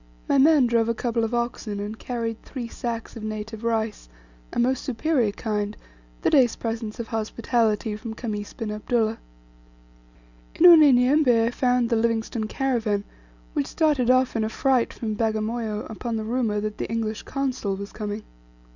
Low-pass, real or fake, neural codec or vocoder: 7.2 kHz; real; none